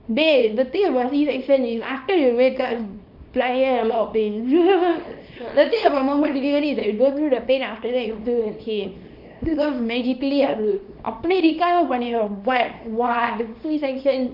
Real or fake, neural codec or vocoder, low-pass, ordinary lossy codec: fake; codec, 24 kHz, 0.9 kbps, WavTokenizer, small release; 5.4 kHz; none